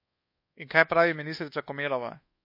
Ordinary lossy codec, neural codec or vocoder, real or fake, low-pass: MP3, 32 kbps; codec, 24 kHz, 1.2 kbps, DualCodec; fake; 5.4 kHz